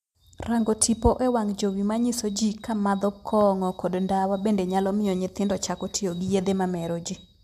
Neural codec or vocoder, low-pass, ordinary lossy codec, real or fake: none; 14.4 kHz; MP3, 96 kbps; real